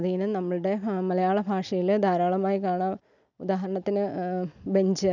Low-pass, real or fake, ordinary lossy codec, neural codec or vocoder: 7.2 kHz; real; none; none